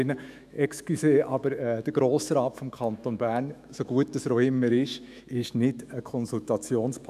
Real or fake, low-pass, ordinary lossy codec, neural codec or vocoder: fake; 14.4 kHz; none; autoencoder, 48 kHz, 128 numbers a frame, DAC-VAE, trained on Japanese speech